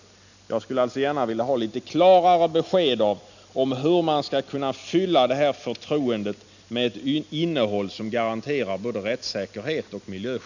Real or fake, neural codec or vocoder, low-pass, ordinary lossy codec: real; none; 7.2 kHz; none